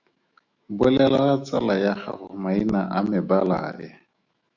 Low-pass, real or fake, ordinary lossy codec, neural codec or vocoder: 7.2 kHz; fake; Opus, 64 kbps; autoencoder, 48 kHz, 128 numbers a frame, DAC-VAE, trained on Japanese speech